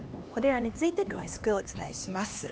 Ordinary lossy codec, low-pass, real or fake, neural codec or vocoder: none; none; fake; codec, 16 kHz, 2 kbps, X-Codec, HuBERT features, trained on LibriSpeech